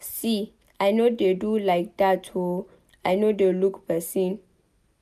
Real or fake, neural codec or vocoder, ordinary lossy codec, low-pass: real; none; none; 14.4 kHz